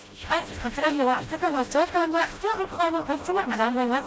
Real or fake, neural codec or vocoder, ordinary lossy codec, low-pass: fake; codec, 16 kHz, 0.5 kbps, FreqCodec, smaller model; none; none